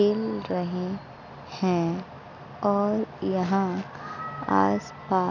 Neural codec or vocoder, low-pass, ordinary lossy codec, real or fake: none; 7.2 kHz; none; real